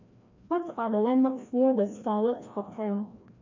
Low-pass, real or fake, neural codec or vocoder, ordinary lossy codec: 7.2 kHz; fake; codec, 16 kHz, 1 kbps, FreqCodec, larger model; AAC, 48 kbps